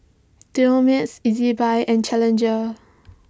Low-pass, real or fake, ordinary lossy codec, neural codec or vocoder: none; real; none; none